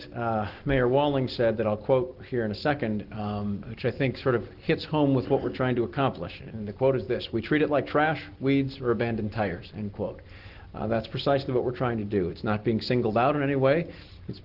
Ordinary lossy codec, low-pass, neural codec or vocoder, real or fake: Opus, 16 kbps; 5.4 kHz; none; real